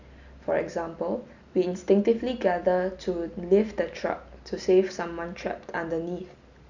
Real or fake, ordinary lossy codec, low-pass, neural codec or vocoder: real; none; 7.2 kHz; none